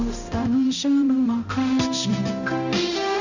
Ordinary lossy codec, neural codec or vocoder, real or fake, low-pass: none; codec, 16 kHz, 0.5 kbps, X-Codec, HuBERT features, trained on balanced general audio; fake; 7.2 kHz